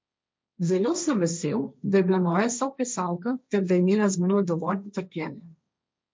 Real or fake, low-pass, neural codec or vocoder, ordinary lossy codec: fake; none; codec, 16 kHz, 1.1 kbps, Voila-Tokenizer; none